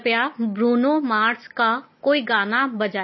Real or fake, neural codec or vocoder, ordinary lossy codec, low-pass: real; none; MP3, 24 kbps; 7.2 kHz